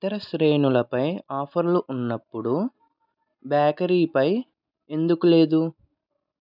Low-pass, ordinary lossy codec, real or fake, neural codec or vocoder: 5.4 kHz; none; real; none